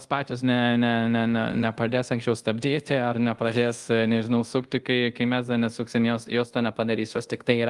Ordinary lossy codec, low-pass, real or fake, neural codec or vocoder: Opus, 24 kbps; 10.8 kHz; fake; codec, 24 kHz, 0.5 kbps, DualCodec